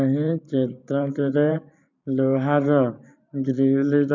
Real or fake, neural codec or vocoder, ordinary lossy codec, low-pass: fake; vocoder, 44.1 kHz, 128 mel bands every 512 samples, BigVGAN v2; none; 7.2 kHz